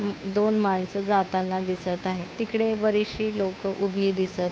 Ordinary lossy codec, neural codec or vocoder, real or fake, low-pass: none; codec, 16 kHz, 2 kbps, FunCodec, trained on Chinese and English, 25 frames a second; fake; none